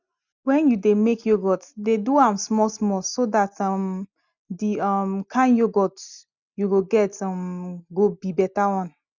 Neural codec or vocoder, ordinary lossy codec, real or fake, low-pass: none; none; real; 7.2 kHz